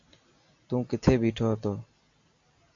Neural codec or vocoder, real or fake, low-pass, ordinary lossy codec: none; real; 7.2 kHz; AAC, 48 kbps